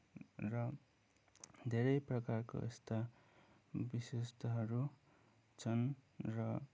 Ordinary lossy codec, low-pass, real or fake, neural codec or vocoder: none; none; real; none